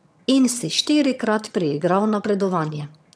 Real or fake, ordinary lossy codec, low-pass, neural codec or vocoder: fake; none; none; vocoder, 22.05 kHz, 80 mel bands, HiFi-GAN